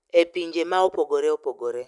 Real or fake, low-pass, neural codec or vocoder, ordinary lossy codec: real; 10.8 kHz; none; none